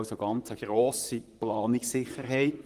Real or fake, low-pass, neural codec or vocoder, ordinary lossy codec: fake; 14.4 kHz; vocoder, 44.1 kHz, 128 mel bands, Pupu-Vocoder; Opus, 24 kbps